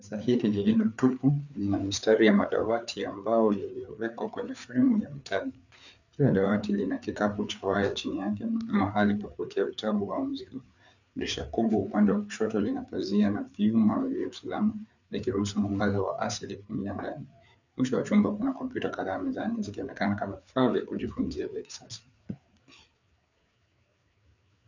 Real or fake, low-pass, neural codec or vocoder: fake; 7.2 kHz; codec, 16 kHz, 4 kbps, FreqCodec, larger model